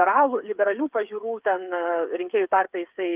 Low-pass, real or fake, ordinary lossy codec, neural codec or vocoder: 3.6 kHz; fake; Opus, 32 kbps; codec, 16 kHz, 8 kbps, FreqCodec, smaller model